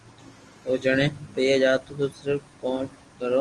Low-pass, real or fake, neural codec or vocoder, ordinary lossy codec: 10.8 kHz; real; none; Opus, 24 kbps